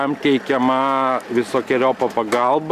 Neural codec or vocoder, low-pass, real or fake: none; 14.4 kHz; real